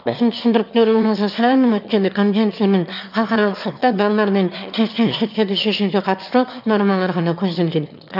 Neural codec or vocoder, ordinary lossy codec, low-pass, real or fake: autoencoder, 22.05 kHz, a latent of 192 numbers a frame, VITS, trained on one speaker; none; 5.4 kHz; fake